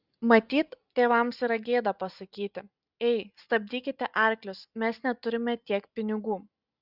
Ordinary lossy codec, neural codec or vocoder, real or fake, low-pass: Opus, 64 kbps; none; real; 5.4 kHz